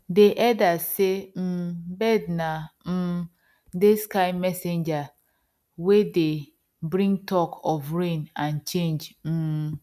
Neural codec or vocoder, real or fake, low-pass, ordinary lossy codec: none; real; 14.4 kHz; none